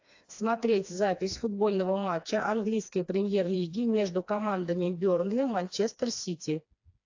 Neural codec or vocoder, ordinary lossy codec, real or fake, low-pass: codec, 16 kHz, 2 kbps, FreqCodec, smaller model; AAC, 48 kbps; fake; 7.2 kHz